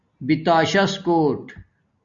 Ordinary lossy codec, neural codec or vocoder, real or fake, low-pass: Opus, 64 kbps; none; real; 7.2 kHz